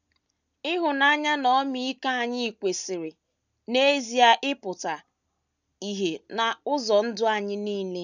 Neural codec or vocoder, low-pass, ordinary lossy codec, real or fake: none; 7.2 kHz; none; real